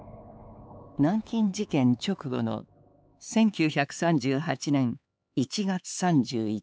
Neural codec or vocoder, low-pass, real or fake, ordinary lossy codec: codec, 16 kHz, 4 kbps, X-Codec, HuBERT features, trained on LibriSpeech; none; fake; none